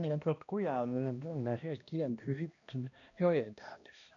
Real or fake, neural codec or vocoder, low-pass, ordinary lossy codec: fake; codec, 16 kHz, 1 kbps, X-Codec, HuBERT features, trained on balanced general audio; 7.2 kHz; none